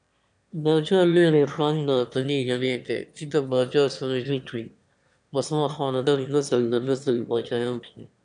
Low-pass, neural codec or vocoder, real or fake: 9.9 kHz; autoencoder, 22.05 kHz, a latent of 192 numbers a frame, VITS, trained on one speaker; fake